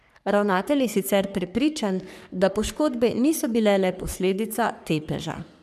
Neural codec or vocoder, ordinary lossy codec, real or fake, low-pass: codec, 44.1 kHz, 3.4 kbps, Pupu-Codec; none; fake; 14.4 kHz